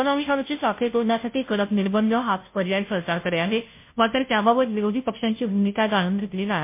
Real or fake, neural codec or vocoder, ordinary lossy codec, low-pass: fake; codec, 16 kHz, 0.5 kbps, FunCodec, trained on Chinese and English, 25 frames a second; MP3, 24 kbps; 3.6 kHz